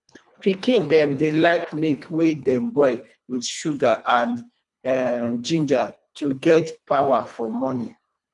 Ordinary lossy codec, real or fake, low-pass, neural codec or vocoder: none; fake; 10.8 kHz; codec, 24 kHz, 1.5 kbps, HILCodec